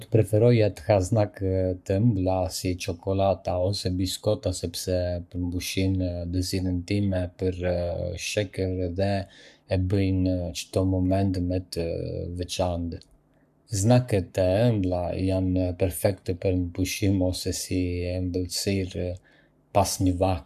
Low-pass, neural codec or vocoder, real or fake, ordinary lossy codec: 14.4 kHz; codec, 44.1 kHz, 7.8 kbps, Pupu-Codec; fake; none